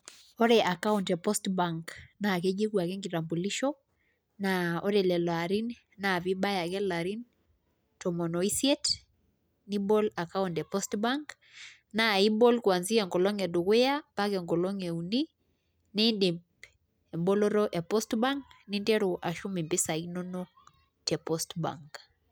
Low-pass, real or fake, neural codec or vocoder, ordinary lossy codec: none; real; none; none